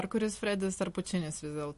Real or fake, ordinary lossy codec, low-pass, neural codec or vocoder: real; MP3, 48 kbps; 14.4 kHz; none